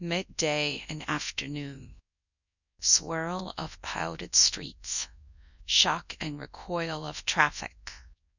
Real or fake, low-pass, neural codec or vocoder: fake; 7.2 kHz; codec, 24 kHz, 0.9 kbps, WavTokenizer, large speech release